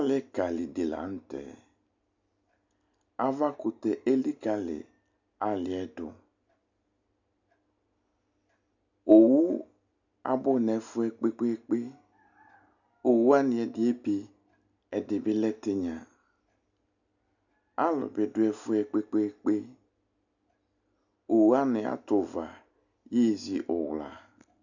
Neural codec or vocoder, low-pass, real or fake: none; 7.2 kHz; real